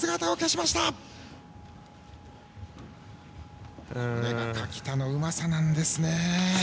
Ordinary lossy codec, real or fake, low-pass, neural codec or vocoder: none; real; none; none